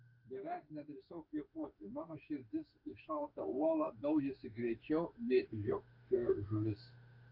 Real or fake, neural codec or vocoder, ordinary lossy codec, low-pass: fake; autoencoder, 48 kHz, 32 numbers a frame, DAC-VAE, trained on Japanese speech; Opus, 32 kbps; 5.4 kHz